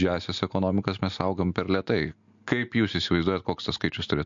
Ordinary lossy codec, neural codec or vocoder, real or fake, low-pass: MP3, 48 kbps; none; real; 7.2 kHz